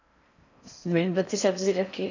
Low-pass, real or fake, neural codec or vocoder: 7.2 kHz; fake; codec, 16 kHz in and 24 kHz out, 0.6 kbps, FocalCodec, streaming, 4096 codes